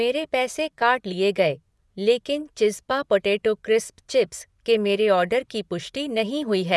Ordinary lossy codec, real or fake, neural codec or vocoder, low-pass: none; fake; vocoder, 24 kHz, 100 mel bands, Vocos; none